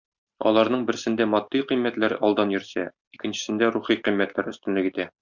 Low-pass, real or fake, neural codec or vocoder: 7.2 kHz; real; none